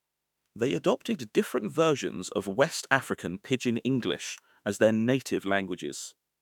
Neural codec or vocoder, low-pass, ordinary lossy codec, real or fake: autoencoder, 48 kHz, 32 numbers a frame, DAC-VAE, trained on Japanese speech; 19.8 kHz; none; fake